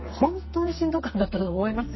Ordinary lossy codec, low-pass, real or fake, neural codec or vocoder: MP3, 24 kbps; 7.2 kHz; fake; codec, 44.1 kHz, 2.6 kbps, SNAC